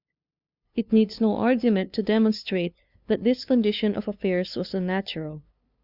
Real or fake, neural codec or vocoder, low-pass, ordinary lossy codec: fake; codec, 16 kHz, 2 kbps, FunCodec, trained on LibriTTS, 25 frames a second; 5.4 kHz; Opus, 64 kbps